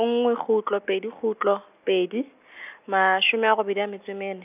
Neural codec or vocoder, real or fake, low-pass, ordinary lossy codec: none; real; 3.6 kHz; none